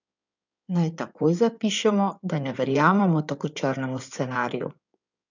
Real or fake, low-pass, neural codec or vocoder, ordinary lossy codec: fake; 7.2 kHz; codec, 16 kHz in and 24 kHz out, 2.2 kbps, FireRedTTS-2 codec; none